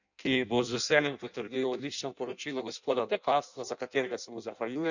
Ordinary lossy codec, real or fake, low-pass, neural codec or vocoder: none; fake; 7.2 kHz; codec, 16 kHz in and 24 kHz out, 0.6 kbps, FireRedTTS-2 codec